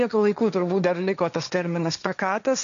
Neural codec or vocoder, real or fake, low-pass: codec, 16 kHz, 1.1 kbps, Voila-Tokenizer; fake; 7.2 kHz